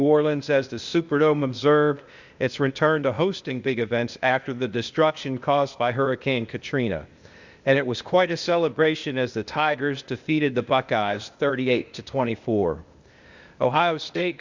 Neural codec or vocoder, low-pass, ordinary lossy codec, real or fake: codec, 16 kHz, 0.8 kbps, ZipCodec; 7.2 kHz; Opus, 64 kbps; fake